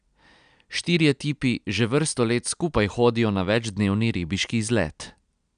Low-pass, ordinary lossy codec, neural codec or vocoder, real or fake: 9.9 kHz; none; none; real